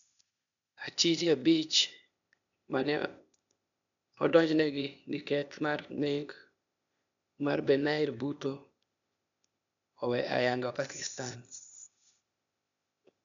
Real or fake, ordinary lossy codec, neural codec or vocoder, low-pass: fake; none; codec, 16 kHz, 0.8 kbps, ZipCodec; 7.2 kHz